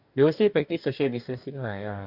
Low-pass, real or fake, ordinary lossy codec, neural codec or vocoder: 5.4 kHz; fake; none; codec, 44.1 kHz, 2.6 kbps, DAC